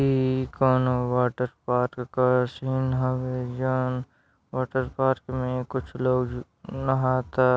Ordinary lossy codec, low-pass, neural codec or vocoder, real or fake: none; none; none; real